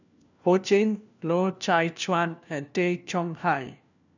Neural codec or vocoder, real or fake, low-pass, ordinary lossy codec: codec, 16 kHz, 1 kbps, FunCodec, trained on LibriTTS, 50 frames a second; fake; 7.2 kHz; none